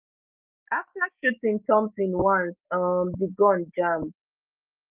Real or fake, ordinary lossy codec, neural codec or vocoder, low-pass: real; Opus, 32 kbps; none; 3.6 kHz